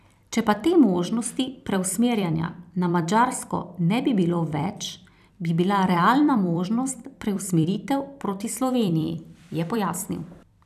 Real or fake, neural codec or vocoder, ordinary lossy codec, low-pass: fake; vocoder, 44.1 kHz, 128 mel bands every 256 samples, BigVGAN v2; none; 14.4 kHz